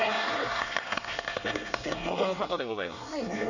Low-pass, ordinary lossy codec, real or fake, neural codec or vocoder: 7.2 kHz; none; fake; codec, 24 kHz, 1 kbps, SNAC